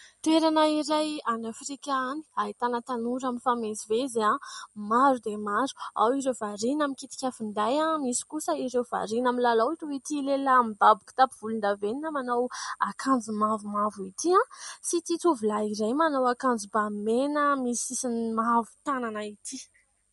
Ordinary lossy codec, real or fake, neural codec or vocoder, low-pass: MP3, 48 kbps; real; none; 19.8 kHz